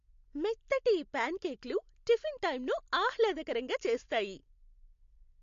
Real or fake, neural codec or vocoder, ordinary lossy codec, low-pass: real; none; MP3, 48 kbps; 7.2 kHz